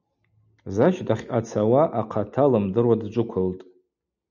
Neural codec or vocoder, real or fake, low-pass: none; real; 7.2 kHz